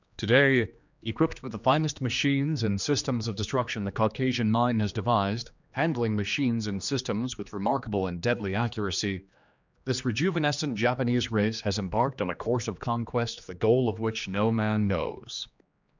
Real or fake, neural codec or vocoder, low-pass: fake; codec, 16 kHz, 2 kbps, X-Codec, HuBERT features, trained on general audio; 7.2 kHz